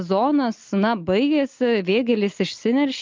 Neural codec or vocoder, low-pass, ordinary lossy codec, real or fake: none; 7.2 kHz; Opus, 32 kbps; real